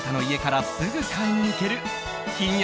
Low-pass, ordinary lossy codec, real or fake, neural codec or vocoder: none; none; real; none